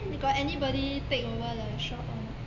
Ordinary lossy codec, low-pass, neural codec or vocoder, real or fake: none; 7.2 kHz; none; real